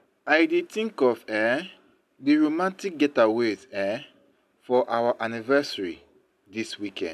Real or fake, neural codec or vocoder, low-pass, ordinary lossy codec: real; none; 14.4 kHz; none